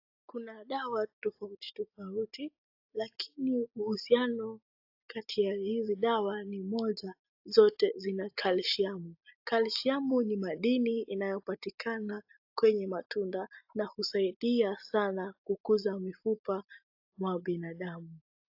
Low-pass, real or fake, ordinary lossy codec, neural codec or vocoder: 5.4 kHz; real; AAC, 48 kbps; none